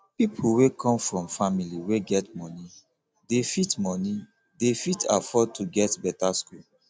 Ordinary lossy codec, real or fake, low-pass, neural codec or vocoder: none; real; none; none